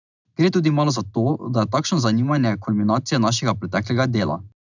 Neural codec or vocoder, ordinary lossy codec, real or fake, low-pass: none; none; real; 7.2 kHz